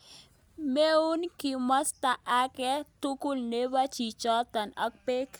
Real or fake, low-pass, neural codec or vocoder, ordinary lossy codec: real; none; none; none